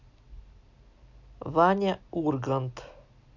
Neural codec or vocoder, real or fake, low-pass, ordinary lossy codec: none; real; 7.2 kHz; none